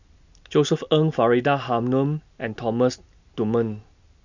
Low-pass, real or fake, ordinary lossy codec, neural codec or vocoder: 7.2 kHz; real; none; none